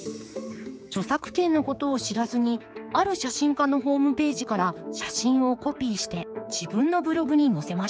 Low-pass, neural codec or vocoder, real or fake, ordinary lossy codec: none; codec, 16 kHz, 4 kbps, X-Codec, HuBERT features, trained on general audio; fake; none